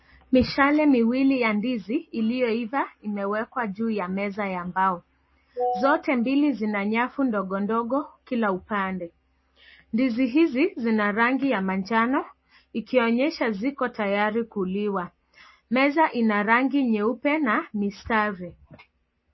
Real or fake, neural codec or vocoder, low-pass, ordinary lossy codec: real; none; 7.2 kHz; MP3, 24 kbps